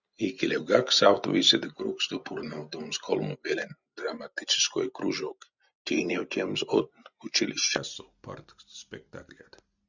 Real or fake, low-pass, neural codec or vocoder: real; 7.2 kHz; none